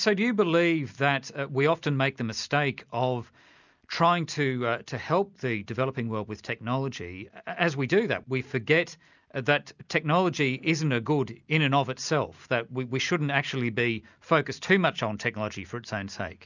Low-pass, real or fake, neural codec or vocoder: 7.2 kHz; real; none